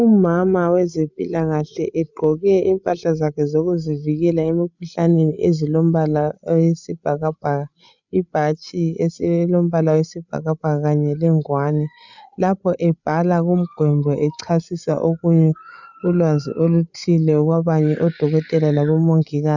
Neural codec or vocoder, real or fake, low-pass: codec, 16 kHz, 8 kbps, FreqCodec, larger model; fake; 7.2 kHz